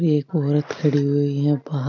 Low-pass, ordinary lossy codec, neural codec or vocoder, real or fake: 7.2 kHz; none; none; real